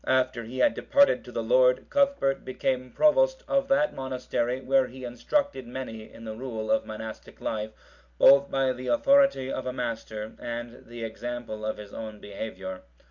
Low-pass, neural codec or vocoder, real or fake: 7.2 kHz; none; real